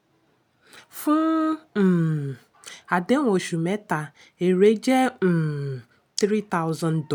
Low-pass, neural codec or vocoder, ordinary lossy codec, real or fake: none; none; none; real